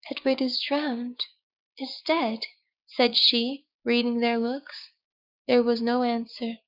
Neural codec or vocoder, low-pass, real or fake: codec, 16 kHz, 6 kbps, DAC; 5.4 kHz; fake